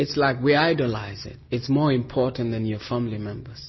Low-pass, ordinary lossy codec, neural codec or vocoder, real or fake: 7.2 kHz; MP3, 24 kbps; none; real